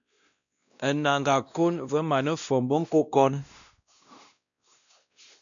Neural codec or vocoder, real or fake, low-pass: codec, 16 kHz, 1 kbps, X-Codec, WavLM features, trained on Multilingual LibriSpeech; fake; 7.2 kHz